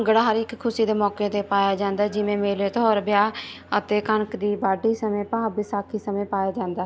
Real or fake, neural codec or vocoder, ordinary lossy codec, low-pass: real; none; none; none